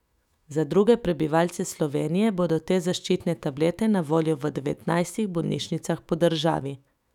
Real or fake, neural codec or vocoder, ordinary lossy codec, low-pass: fake; autoencoder, 48 kHz, 128 numbers a frame, DAC-VAE, trained on Japanese speech; none; 19.8 kHz